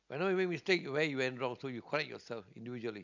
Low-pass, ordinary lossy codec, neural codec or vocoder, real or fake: 7.2 kHz; none; none; real